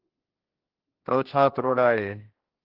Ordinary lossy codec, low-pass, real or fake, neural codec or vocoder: Opus, 16 kbps; 5.4 kHz; fake; codec, 16 kHz, 1 kbps, X-Codec, HuBERT features, trained on general audio